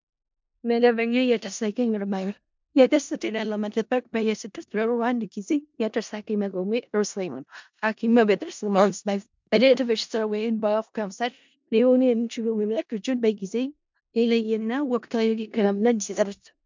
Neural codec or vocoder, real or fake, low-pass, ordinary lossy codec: codec, 16 kHz in and 24 kHz out, 0.4 kbps, LongCat-Audio-Codec, four codebook decoder; fake; 7.2 kHz; MP3, 64 kbps